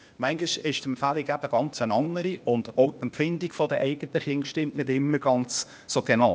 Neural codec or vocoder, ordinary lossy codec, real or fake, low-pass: codec, 16 kHz, 0.8 kbps, ZipCodec; none; fake; none